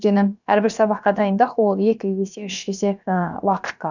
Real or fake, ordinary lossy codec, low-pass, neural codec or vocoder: fake; none; 7.2 kHz; codec, 16 kHz, about 1 kbps, DyCAST, with the encoder's durations